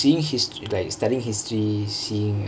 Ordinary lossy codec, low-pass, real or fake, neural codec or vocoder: none; none; real; none